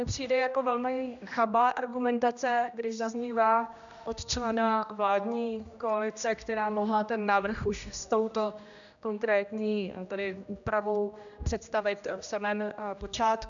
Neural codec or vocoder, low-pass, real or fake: codec, 16 kHz, 1 kbps, X-Codec, HuBERT features, trained on general audio; 7.2 kHz; fake